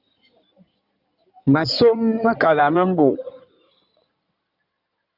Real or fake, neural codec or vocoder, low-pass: fake; codec, 16 kHz in and 24 kHz out, 2.2 kbps, FireRedTTS-2 codec; 5.4 kHz